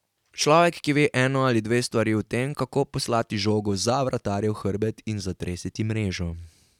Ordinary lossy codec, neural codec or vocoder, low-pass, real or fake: none; none; 19.8 kHz; real